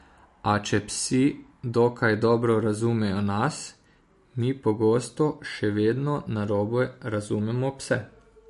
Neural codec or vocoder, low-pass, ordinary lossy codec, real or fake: none; 14.4 kHz; MP3, 48 kbps; real